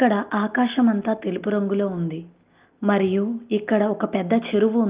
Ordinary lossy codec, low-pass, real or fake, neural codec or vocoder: Opus, 32 kbps; 3.6 kHz; real; none